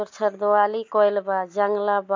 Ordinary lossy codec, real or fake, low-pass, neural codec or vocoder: MP3, 48 kbps; real; 7.2 kHz; none